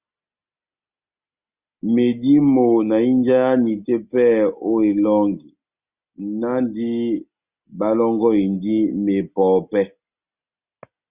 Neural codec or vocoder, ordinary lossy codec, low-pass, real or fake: none; Opus, 64 kbps; 3.6 kHz; real